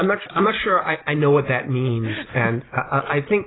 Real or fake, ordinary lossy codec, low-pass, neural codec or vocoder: real; AAC, 16 kbps; 7.2 kHz; none